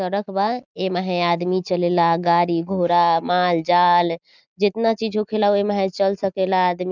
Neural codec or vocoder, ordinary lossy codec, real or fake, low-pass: none; none; real; 7.2 kHz